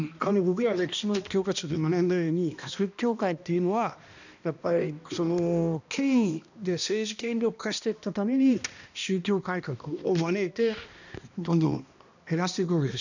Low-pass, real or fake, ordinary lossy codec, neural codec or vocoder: 7.2 kHz; fake; none; codec, 16 kHz, 1 kbps, X-Codec, HuBERT features, trained on balanced general audio